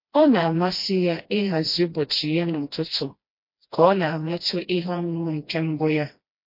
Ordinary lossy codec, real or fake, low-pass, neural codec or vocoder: MP3, 32 kbps; fake; 5.4 kHz; codec, 16 kHz, 1 kbps, FreqCodec, smaller model